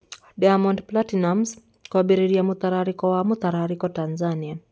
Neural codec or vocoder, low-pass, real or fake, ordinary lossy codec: none; none; real; none